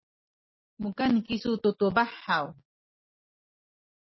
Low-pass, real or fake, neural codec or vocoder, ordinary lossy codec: 7.2 kHz; real; none; MP3, 24 kbps